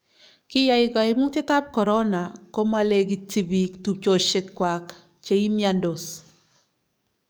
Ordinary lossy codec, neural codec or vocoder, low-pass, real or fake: none; codec, 44.1 kHz, 7.8 kbps, DAC; none; fake